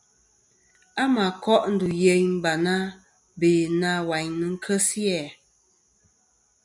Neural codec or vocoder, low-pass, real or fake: none; 10.8 kHz; real